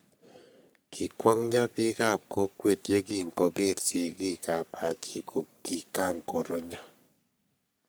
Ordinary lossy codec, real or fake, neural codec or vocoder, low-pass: none; fake; codec, 44.1 kHz, 3.4 kbps, Pupu-Codec; none